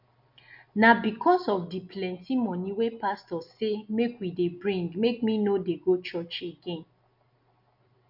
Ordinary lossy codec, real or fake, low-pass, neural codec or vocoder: none; real; 5.4 kHz; none